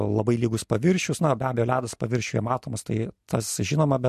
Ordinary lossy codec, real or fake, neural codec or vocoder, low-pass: MP3, 64 kbps; real; none; 14.4 kHz